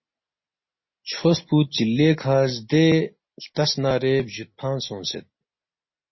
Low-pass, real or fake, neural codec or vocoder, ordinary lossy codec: 7.2 kHz; real; none; MP3, 24 kbps